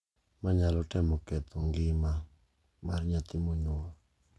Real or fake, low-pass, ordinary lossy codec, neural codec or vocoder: real; none; none; none